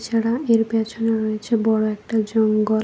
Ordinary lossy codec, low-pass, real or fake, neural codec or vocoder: none; none; real; none